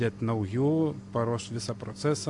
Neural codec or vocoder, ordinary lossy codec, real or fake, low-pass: none; AAC, 64 kbps; real; 10.8 kHz